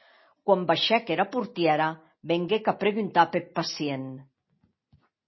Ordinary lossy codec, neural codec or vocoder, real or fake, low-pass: MP3, 24 kbps; none; real; 7.2 kHz